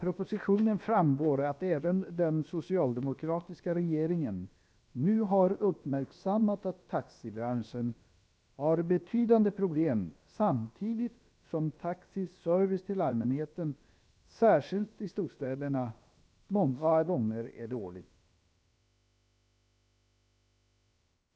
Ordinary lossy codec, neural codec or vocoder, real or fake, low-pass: none; codec, 16 kHz, about 1 kbps, DyCAST, with the encoder's durations; fake; none